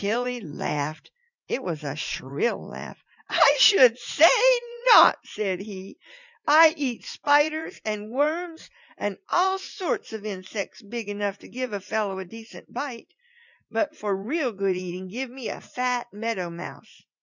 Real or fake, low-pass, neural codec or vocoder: fake; 7.2 kHz; vocoder, 44.1 kHz, 80 mel bands, Vocos